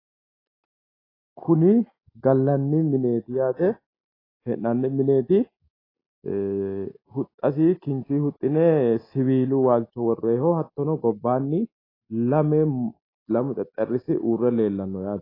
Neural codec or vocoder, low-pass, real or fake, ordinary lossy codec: none; 5.4 kHz; real; AAC, 24 kbps